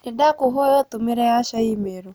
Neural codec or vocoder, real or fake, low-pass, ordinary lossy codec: none; real; none; none